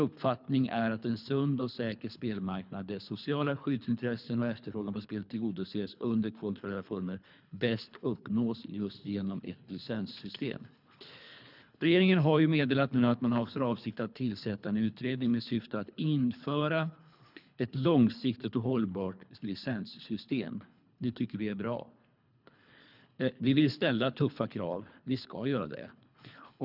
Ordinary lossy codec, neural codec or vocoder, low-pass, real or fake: none; codec, 24 kHz, 3 kbps, HILCodec; 5.4 kHz; fake